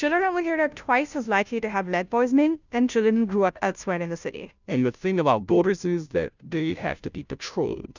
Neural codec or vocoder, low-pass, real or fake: codec, 16 kHz, 0.5 kbps, FunCodec, trained on Chinese and English, 25 frames a second; 7.2 kHz; fake